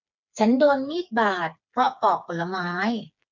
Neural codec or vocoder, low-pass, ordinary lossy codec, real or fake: codec, 16 kHz, 4 kbps, FreqCodec, smaller model; 7.2 kHz; none; fake